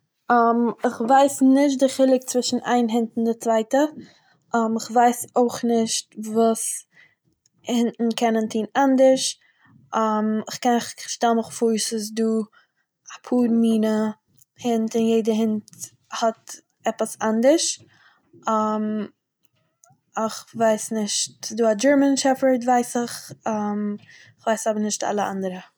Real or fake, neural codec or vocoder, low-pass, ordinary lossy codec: real; none; none; none